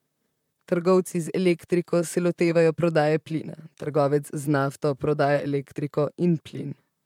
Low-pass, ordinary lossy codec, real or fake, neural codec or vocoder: 19.8 kHz; MP3, 96 kbps; fake; vocoder, 44.1 kHz, 128 mel bands, Pupu-Vocoder